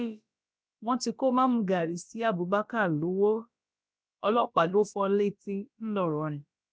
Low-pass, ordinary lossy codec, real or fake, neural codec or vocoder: none; none; fake; codec, 16 kHz, about 1 kbps, DyCAST, with the encoder's durations